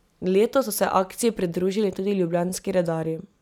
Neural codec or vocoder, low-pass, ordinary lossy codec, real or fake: none; 19.8 kHz; none; real